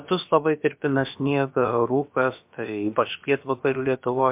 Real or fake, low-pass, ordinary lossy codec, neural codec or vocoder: fake; 3.6 kHz; MP3, 32 kbps; codec, 16 kHz, about 1 kbps, DyCAST, with the encoder's durations